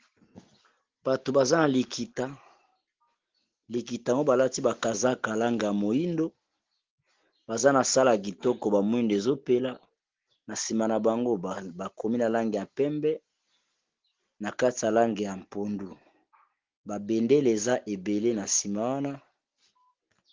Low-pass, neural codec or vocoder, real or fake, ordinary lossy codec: 7.2 kHz; none; real; Opus, 16 kbps